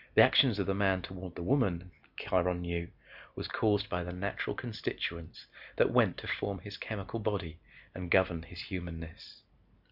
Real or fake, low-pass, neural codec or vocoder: real; 5.4 kHz; none